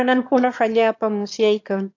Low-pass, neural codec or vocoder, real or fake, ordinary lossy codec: 7.2 kHz; autoencoder, 22.05 kHz, a latent of 192 numbers a frame, VITS, trained on one speaker; fake; AAC, 48 kbps